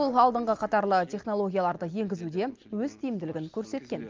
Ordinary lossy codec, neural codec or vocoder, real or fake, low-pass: Opus, 32 kbps; none; real; 7.2 kHz